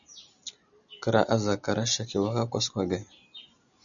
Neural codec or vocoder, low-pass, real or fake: none; 7.2 kHz; real